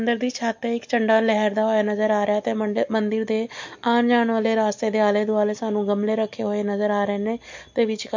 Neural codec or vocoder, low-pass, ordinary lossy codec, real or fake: none; 7.2 kHz; MP3, 48 kbps; real